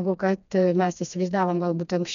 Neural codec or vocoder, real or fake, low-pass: codec, 16 kHz, 2 kbps, FreqCodec, smaller model; fake; 7.2 kHz